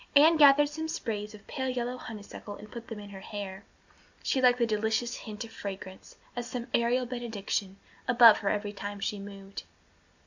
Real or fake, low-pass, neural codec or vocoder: real; 7.2 kHz; none